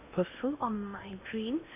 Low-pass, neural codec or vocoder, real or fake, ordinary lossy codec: 3.6 kHz; codec, 16 kHz in and 24 kHz out, 0.8 kbps, FocalCodec, streaming, 65536 codes; fake; none